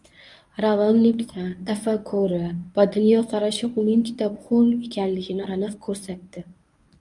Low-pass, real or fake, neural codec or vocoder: 10.8 kHz; fake; codec, 24 kHz, 0.9 kbps, WavTokenizer, medium speech release version 1